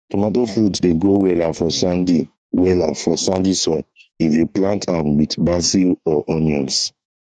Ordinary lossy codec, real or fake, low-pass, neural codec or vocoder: none; fake; 9.9 kHz; codec, 44.1 kHz, 2.6 kbps, DAC